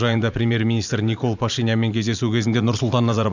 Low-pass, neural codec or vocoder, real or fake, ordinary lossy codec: 7.2 kHz; vocoder, 44.1 kHz, 128 mel bands every 512 samples, BigVGAN v2; fake; none